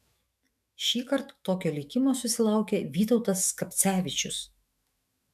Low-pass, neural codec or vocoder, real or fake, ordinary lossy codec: 14.4 kHz; autoencoder, 48 kHz, 128 numbers a frame, DAC-VAE, trained on Japanese speech; fake; MP3, 96 kbps